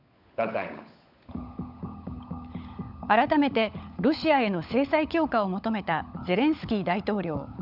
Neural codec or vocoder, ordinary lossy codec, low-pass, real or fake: codec, 16 kHz, 16 kbps, FunCodec, trained on LibriTTS, 50 frames a second; none; 5.4 kHz; fake